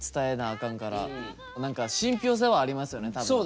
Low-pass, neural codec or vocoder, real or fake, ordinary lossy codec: none; none; real; none